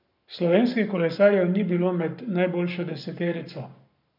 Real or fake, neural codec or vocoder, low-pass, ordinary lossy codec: fake; codec, 44.1 kHz, 7.8 kbps, Pupu-Codec; 5.4 kHz; none